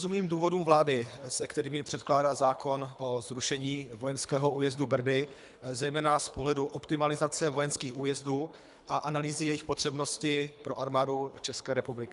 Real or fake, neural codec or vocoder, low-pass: fake; codec, 24 kHz, 3 kbps, HILCodec; 10.8 kHz